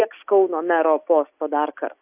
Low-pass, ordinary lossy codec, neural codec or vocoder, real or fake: 3.6 kHz; AAC, 32 kbps; none; real